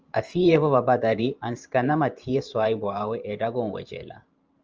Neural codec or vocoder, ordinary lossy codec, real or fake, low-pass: vocoder, 44.1 kHz, 128 mel bands every 512 samples, BigVGAN v2; Opus, 24 kbps; fake; 7.2 kHz